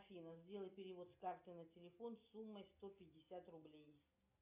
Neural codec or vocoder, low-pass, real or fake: none; 3.6 kHz; real